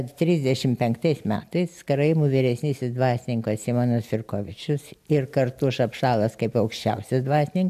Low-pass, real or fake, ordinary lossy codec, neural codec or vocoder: 14.4 kHz; real; AAC, 96 kbps; none